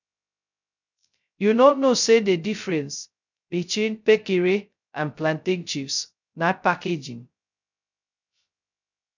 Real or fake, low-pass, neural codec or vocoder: fake; 7.2 kHz; codec, 16 kHz, 0.2 kbps, FocalCodec